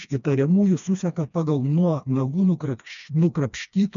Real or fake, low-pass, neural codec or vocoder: fake; 7.2 kHz; codec, 16 kHz, 2 kbps, FreqCodec, smaller model